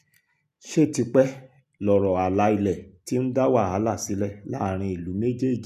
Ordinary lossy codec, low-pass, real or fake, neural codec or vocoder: none; 14.4 kHz; real; none